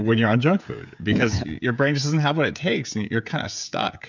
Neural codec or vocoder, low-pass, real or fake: codec, 16 kHz, 16 kbps, FreqCodec, smaller model; 7.2 kHz; fake